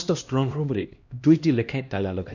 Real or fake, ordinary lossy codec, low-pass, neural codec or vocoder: fake; none; 7.2 kHz; codec, 16 kHz, 1 kbps, X-Codec, HuBERT features, trained on LibriSpeech